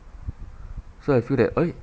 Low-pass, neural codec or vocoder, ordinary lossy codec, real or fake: none; none; none; real